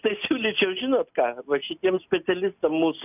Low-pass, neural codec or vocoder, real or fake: 3.6 kHz; none; real